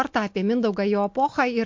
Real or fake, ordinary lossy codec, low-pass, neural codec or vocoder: real; MP3, 48 kbps; 7.2 kHz; none